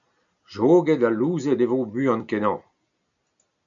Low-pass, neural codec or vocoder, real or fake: 7.2 kHz; none; real